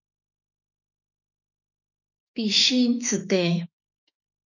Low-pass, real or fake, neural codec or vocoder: 7.2 kHz; fake; autoencoder, 48 kHz, 32 numbers a frame, DAC-VAE, trained on Japanese speech